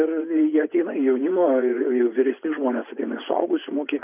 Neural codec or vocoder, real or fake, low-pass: vocoder, 24 kHz, 100 mel bands, Vocos; fake; 3.6 kHz